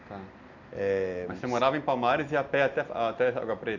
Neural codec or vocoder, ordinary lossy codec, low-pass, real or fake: none; none; 7.2 kHz; real